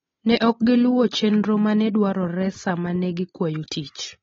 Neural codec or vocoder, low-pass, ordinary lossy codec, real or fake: none; 7.2 kHz; AAC, 24 kbps; real